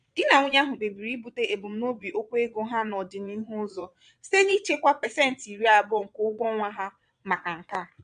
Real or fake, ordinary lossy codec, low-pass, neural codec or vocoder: fake; MP3, 64 kbps; 9.9 kHz; vocoder, 22.05 kHz, 80 mel bands, Vocos